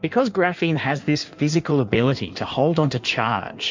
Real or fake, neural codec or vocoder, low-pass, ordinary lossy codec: fake; codec, 16 kHz in and 24 kHz out, 1.1 kbps, FireRedTTS-2 codec; 7.2 kHz; MP3, 64 kbps